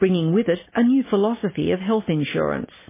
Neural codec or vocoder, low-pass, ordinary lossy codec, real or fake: none; 3.6 kHz; MP3, 16 kbps; real